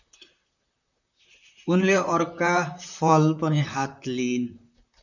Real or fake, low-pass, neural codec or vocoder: fake; 7.2 kHz; vocoder, 44.1 kHz, 128 mel bands, Pupu-Vocoder